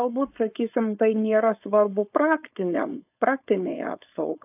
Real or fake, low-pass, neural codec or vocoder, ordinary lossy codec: fake; 3.6 kHz; codec, 16 kHz, 4.8 kbps, FACodec; AAC, 32 kbps